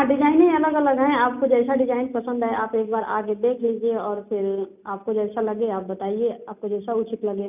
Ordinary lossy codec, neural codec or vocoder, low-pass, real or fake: none; none; 3.6 kHz; real